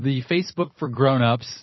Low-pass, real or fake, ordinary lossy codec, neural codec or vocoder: 7.2 kHz; fake; MP3, 24 kbps; vocoder, 44.1 kHz, 128 mel bands every 256 samples, BigVGAN v2